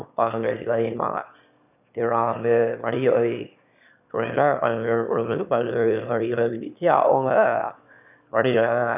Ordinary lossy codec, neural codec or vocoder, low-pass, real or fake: none; autoencoder, 22.05 kHz, a latent of 192 numbers a frame, VITS, trained on one speaker; 3.6 kHz; fake